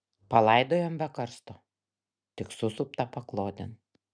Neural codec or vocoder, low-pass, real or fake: none; 9.9 kHz; real